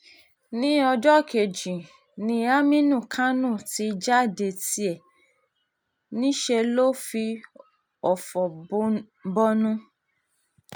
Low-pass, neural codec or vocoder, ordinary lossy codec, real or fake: none; none; none; real